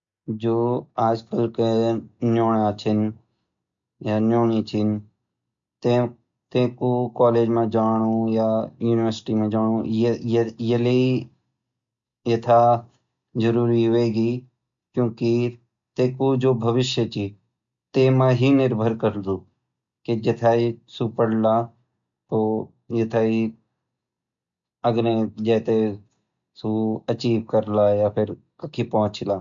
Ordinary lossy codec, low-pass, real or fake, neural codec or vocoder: MP3, 64 kbps; 7.2 kHz; real; none